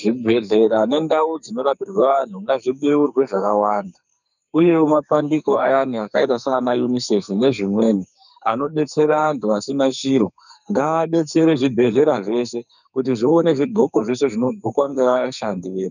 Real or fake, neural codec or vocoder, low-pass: fake; codec, 44.1 kHz, 2.6 kbps, SNAC; 7.2 kHz